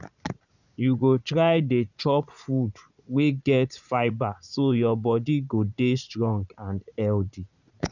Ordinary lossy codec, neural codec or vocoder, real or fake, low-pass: none; vocoder, 44.1 kHz, 80 mel bands, Vocos; fake; 7.2 kHz